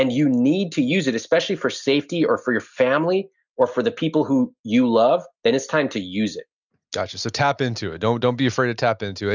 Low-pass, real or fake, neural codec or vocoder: 7.2 kHz; real; none